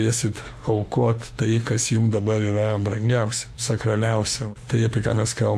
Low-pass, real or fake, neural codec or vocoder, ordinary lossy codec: 14.4 kHz; fake; autoencoder, 48 kHz, 32 numbers a frame, DAC-VAE, trained on Japanese speech; AAC, 96 kbps